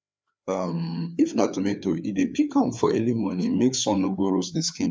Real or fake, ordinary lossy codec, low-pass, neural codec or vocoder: fake; none; none; codec, 16 kHz, 4 kbps, FreqCodec, larger model